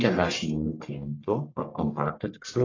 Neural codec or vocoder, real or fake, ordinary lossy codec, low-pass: codec, 44.1 kHz, 1.7 kbps, Pupu-Codec; fake; AAC, 32 kbps; 7.2 kHz